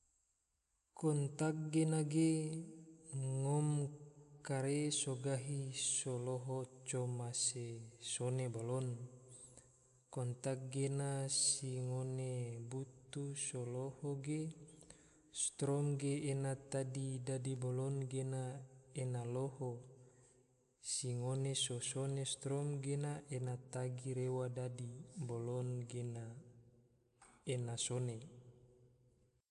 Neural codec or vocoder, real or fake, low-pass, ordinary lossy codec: none; real; 10.8 kHz; none